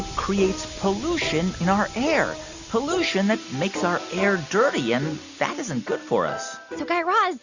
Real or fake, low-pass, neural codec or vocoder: real; 7.2 kHz; none